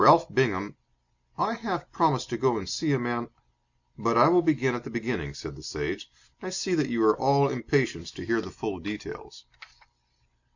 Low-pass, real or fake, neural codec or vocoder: 7.2 kHz; real; none